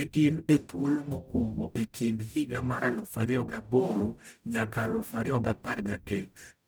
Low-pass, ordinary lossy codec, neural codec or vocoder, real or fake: none; none; codec, 44.1 kHz, 0.9 kbps, DAC; fake